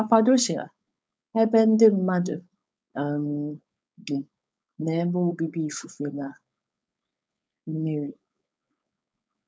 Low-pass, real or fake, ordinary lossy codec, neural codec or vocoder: none; fake; none; codec, 16 kHz, 4.8 kbps, FACodec